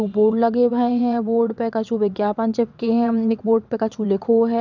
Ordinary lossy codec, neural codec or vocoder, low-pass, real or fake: none; vocoder, 22.05 kHz, 80 mel bands, WaveNeXt; 7.2 kHz; fake